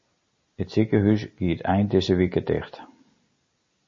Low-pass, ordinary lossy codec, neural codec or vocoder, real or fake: 7.2 kHz; MP3, 32 kbps; none; real